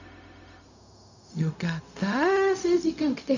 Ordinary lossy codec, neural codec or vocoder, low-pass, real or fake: none; codec, 16 kHz, 0.4 kbps, LongCat-Audio-Codec; 7.2 kHz; fake